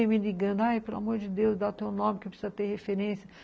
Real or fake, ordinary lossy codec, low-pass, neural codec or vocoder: real; none; none; none